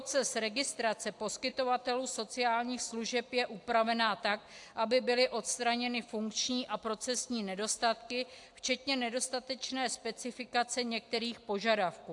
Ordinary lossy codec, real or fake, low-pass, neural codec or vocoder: AAC, 64 kbps; real; 10.8 kHz; none